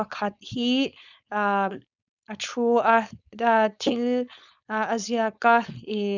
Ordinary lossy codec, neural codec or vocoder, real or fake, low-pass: none; codec, 16 kHz, 4.8 kbps, FACodec; fake; 7.2 kHz